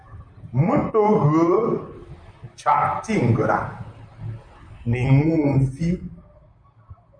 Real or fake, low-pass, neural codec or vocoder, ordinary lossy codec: fake; 9.9 kHz; vocoder, 44.1 kHz, 128 mel bands, Pupu-Vocoder; MP3, 96 kbps